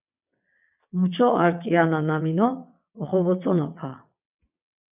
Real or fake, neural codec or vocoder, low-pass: fake; vocoder, 22.05 kHz, 80 mel bands, WaveNeXt; 3.6 kHz